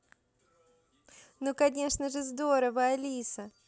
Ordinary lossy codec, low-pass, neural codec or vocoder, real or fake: none; none; none; real